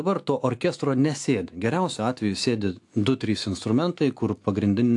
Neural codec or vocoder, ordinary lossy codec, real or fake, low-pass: autoencoder, 48 kHz, 128 numbers a frame, DAC-VAE, trained on Japanese speech; AAC, 64 kbps; fake; 10.8 kHz